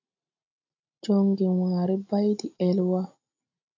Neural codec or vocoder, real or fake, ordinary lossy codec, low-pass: none; real; AAC, 48 kbps; 7.2 kHz